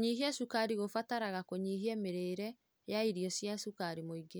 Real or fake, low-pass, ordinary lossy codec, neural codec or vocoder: real; none; none; none